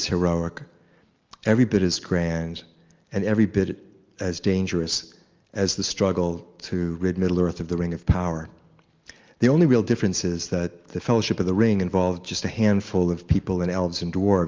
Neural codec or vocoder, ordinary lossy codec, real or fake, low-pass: none; Opus, 32 kbps; real; 7.2 kHz